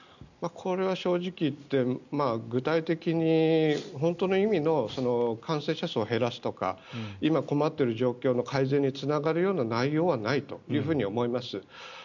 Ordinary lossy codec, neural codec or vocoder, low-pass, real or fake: none; none; 7.2 kHz; real